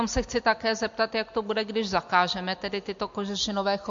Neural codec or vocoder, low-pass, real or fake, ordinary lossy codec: none; 7.2 kHz; real; MP3, 48 kbps